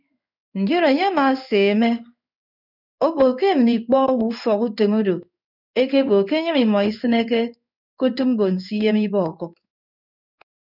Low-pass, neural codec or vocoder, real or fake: 5.4 kHz; codec, 16 kHz in and 24 kHz out, 1 kbps, XY-Tokenizer; fake